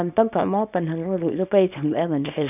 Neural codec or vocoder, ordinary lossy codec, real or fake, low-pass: codec, 24 kHz, 0.9 kbps, WavTokenizer, small release; none; fake; 3.6 kHz